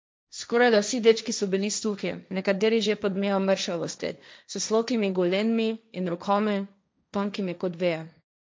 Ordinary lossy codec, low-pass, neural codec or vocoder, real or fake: none; none; codec, 16 kHz, 1.1 kbps, Voila-Tokenizer; fake